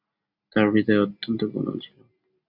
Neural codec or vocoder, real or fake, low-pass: none; real; 5.4 kHz